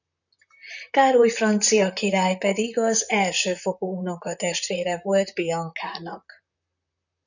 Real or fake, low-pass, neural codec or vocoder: fake; 7.2 kHz; vocoder, 44.1 kHz, 128 mel bands, Pupu-Vocoder